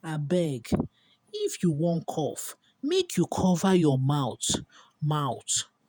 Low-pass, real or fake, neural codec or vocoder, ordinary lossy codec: none; fake; vocoder, 48 kHz, 128 mel bands, Vocos; none